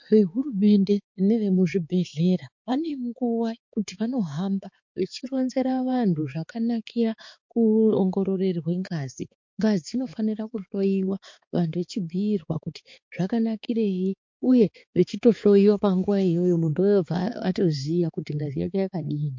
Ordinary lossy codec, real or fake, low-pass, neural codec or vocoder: MP3, 48 kbps; fake; 7.2 kHz; codec, 16 kHz, 4 kbps, X-Codec, HuBERT features, trained on balanced general audio